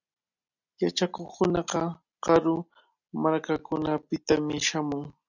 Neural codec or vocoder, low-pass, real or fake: none; 7.2 kHz; real